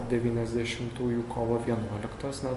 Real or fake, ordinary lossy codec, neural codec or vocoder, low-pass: real; MP3, 48 kbps; none; 14.4 kHz